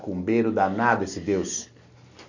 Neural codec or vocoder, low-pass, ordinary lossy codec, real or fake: none; 7.2 kHz; none; real